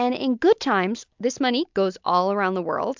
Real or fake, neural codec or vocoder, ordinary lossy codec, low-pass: real; none; MP3, 64 kbps; 7.2 kHz